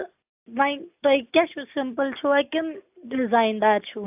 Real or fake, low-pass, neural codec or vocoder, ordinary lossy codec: real; 3.6 kHz; none; AAC, 32 kbps